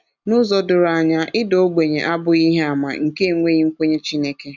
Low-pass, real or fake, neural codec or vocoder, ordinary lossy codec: 7.2 kHz; real; none; none